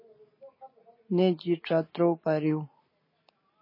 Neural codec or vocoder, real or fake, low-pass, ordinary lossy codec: none; real; 5.4 kHz; MP3, 24 kbps